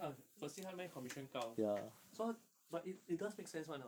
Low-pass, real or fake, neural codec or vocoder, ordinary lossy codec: none; fake; vocoder, 44.1 kHz, 128 mel bands every 512 samples, BigVGAN v2; none